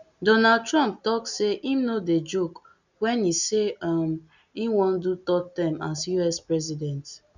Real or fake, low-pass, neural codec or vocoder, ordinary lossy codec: real; 7.2 kHz; none; none